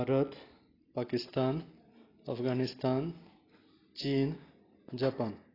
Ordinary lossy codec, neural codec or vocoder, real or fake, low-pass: AAC, 24 kbps; none; real; 5.4 kHz